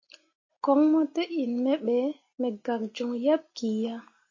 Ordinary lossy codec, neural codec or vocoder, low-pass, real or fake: MP3, 32 kbps; none; 7.2 kHz; real